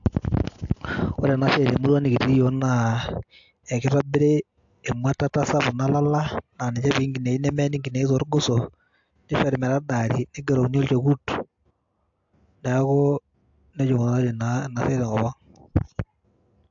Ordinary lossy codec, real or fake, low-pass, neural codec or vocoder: AAC, 64 kbps; real; 7.2 kHz; none